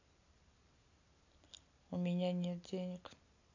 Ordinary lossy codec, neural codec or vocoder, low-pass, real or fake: AAC, 48 kbps; none; 7.2 kHz; real